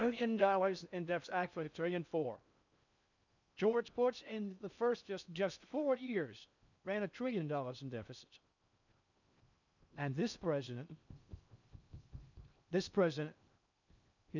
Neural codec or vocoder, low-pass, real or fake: codec, 16 kHz in and 24 kHz out, 0.6 kbps, FocalCodec, streaming, 4096 codes; 7.2 kHz; fake